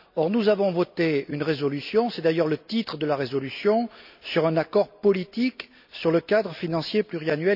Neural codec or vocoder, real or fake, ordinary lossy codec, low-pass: none; real; none; 5.4 kHz